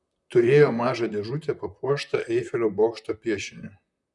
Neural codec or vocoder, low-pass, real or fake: vocoder, 44.1 kHz, 128 mel bands, Pupu-Vocoder; 10.8 kHz; fake